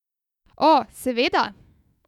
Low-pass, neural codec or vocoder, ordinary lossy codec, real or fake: 19.8 kHz; autoencoder, 48 kHz, 128 numbers a frame, DAC-VAE, trained on Japanese speech; none; fake